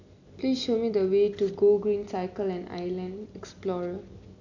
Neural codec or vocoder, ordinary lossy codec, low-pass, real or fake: none; none; 7.2 kHz; real